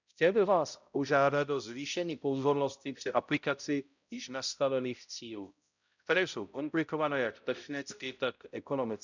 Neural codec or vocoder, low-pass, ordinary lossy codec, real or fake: codec, 16 kHz, 0.5 kbps, X-Codec, HuBERT features, trained on balanced general audio; 7.2 kHz; none; fake